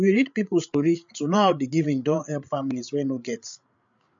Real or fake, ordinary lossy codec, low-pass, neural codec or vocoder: fake; MP3, 64 kbps; 7.2 kHz; codec, 16 kHz, 8 kbps, FreqCodec, larger model